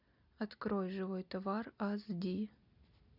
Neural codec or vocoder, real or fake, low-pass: none; real; 5.4 kHz